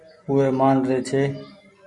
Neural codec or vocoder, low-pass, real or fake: none; 10.8 kHz; real